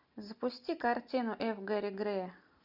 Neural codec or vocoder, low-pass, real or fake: none; 5.4 kHz; real